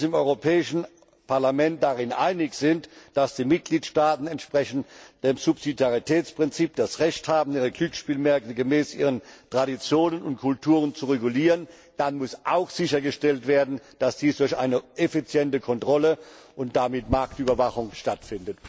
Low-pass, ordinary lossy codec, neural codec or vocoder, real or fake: none; none; none; real